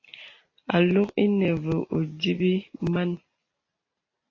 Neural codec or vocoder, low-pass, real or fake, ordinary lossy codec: none; 7.2 kHz; real; AAC, 32 kbps